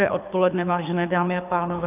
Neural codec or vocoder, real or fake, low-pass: codec, 24 kHz, 6 kbps, HILCodec; fake; 3.6 kHz